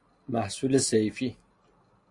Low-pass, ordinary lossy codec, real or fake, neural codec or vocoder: 10.8 kHz; AAC, 48 kbps; real; none